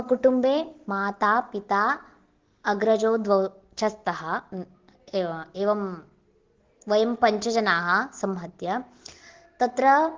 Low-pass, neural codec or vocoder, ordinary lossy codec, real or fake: 7.2 kHz; none; Opus, 16 kbps; real